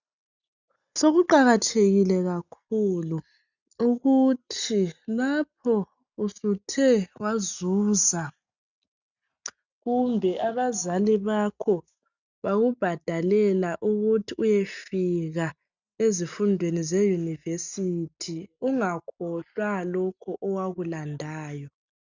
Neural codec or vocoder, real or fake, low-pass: none; real; 7.2 kHz